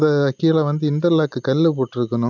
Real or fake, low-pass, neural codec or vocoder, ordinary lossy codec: real; 7.2 kHz; none; none